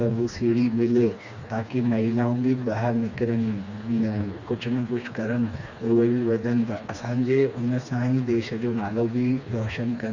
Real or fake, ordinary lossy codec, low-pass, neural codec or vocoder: fake; Opus, 64 kbps; 7.2 kHz; codec, 16 kHz, 2 kbps, FreqCodec, smaller model